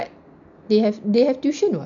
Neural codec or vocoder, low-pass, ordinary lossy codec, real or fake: none; 7.2 kHz; MP3, 96 kbps; real